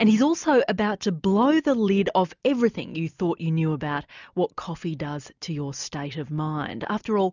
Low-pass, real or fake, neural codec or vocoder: 7.2 kHz; real; none